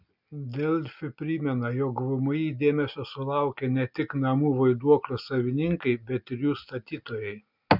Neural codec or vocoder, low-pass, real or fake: none; 5.4 kHz; real